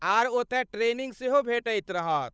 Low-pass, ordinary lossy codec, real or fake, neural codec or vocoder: none; none; fake; codec, 16 kHz, 8 kbps, FunCodec, trained on Chinese and English, 25 frames a second